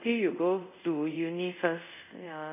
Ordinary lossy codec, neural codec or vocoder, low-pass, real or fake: none; codec, 24 kHz, 0.5 kbps, DualCodec; 3.6 kHz; fake